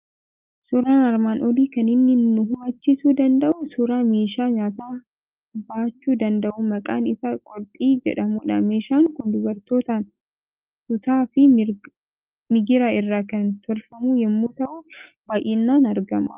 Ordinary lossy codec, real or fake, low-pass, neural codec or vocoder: Opus, 32 kbps; real; 3.6 kHz; none